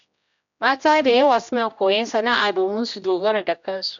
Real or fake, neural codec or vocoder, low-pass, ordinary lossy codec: fake; codec, 16 kHz, 1 kbps, X-Codec, HuBERT features, trained on general audio; 7.2 kHz; none